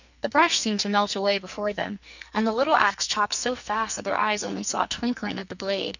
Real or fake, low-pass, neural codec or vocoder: fake; 7.2 kHz; codec, 44.1 kHz, 2.6 kbps, SNAC